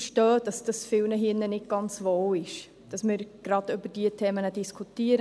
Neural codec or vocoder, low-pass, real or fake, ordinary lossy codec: none; none; real; none